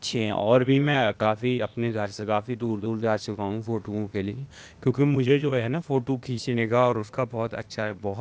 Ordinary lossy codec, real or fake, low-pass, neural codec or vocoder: none; fake; none; codec, 16 kHz, 0.8 kbps, ZipCodec